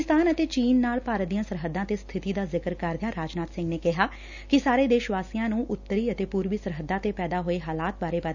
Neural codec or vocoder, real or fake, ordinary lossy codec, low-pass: none; real; none; 7.2 kHz